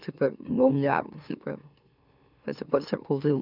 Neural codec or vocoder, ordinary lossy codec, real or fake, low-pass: autoencoder, 44.1 kHz, a latent of 192 numbers a frame, MeloTTS; none; fake; 5.4 kHz